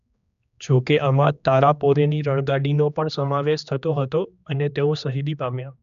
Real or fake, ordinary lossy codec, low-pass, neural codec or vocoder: fake; none; 7.2 kHz; codec, 16 kHz, 2 kbps, X-Codec, HuBERT features, trained on general audio